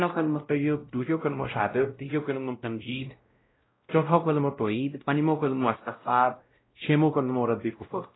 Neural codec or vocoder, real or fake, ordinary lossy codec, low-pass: codec, 16 kHz, 0.5 kbps, X-Codec, WavLM features, trained on Multilingual LibriSpeech; fake; AAC, 16 kbps; 7.2 kHz